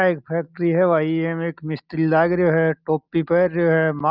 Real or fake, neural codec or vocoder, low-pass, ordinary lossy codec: real; none; 5.4 kHz; Opus, 32 kbps